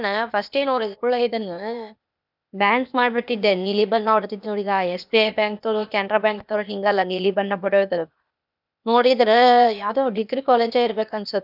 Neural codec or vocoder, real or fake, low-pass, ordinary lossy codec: codec, 16 kHz, 0.8 kbps, ZipCodec; fake; 5.4 kHz; none